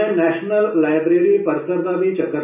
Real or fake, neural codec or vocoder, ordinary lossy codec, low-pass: real; none; none; 3.6 kHz